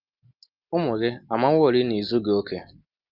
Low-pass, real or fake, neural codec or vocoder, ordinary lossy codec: 5.4 kHz; real; none; Opus, 24 kbps